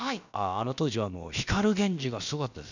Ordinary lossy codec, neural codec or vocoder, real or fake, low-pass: none; codec, 16 kHz, about 1 kbps, DyCAST, with the encoder's durations; fake; 7.2 kHz